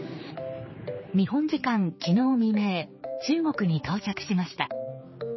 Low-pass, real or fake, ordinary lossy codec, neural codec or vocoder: 7.2 kHz; fake; MP3, 24 kbps; codec, 16 kHz, 4 kbps, X-Codec, HuBERT features, trained on general audio